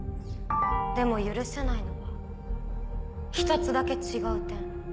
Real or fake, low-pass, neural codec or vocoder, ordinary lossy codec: real; none; none; none